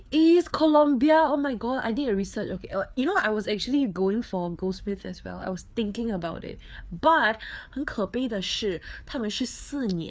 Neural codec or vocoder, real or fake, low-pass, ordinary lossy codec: codec, 16 kHz, 4 kbps, FreqCodec, larger model; fake; none; none